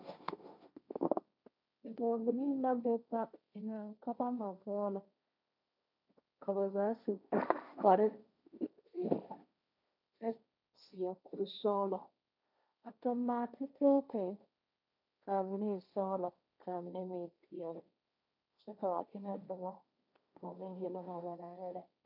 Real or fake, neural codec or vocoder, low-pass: fake; codec, 16 kHz, 1.1 kbps, Voila-Tokenizer; 5.4 kHz